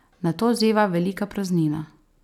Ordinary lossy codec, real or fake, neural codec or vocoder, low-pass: none; real; none; 19.8 kHz